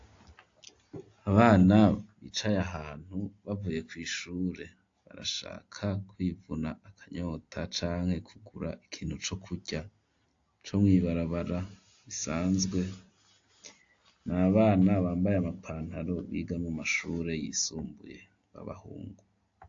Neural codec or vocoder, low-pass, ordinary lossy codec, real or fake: none; 7.2 kHz; AAC, 48 kbps; real